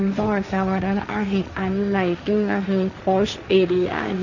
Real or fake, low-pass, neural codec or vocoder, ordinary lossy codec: fake; 7.2 kHz; codec, 16 kHz, 1.1 kbps, Voila-Tokenizer; none